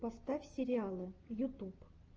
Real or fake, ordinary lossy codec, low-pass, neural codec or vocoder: real; Opus, 24 kbps; 7.2 kHz; none